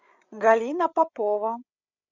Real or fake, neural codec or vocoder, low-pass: fake; codec, 16 kHz, 8 kbps, FreqCodec, larger model; 7.2 kHz